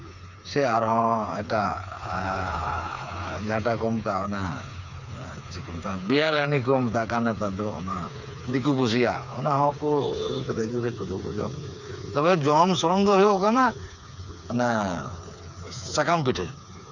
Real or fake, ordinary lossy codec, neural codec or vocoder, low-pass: fake; none; codec, 16 kHz, 4 kbps, FreqCodec, smaller model; 7.2 kHz